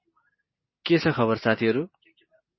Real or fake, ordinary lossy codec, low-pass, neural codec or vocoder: real; MP3, 24 kbps; 7.2 kHz; none